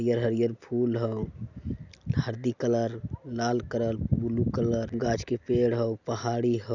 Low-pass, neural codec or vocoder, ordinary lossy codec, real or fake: 7.2 kHz; none; none; real